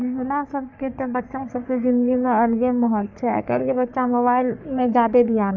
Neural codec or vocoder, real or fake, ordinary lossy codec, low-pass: codec, 44.1 kHz, 3.4 kbps, Pupu-Codec; fake; none; 7.2 kHz